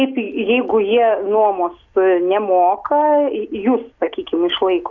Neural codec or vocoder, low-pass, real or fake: none; 7.2 kHz; real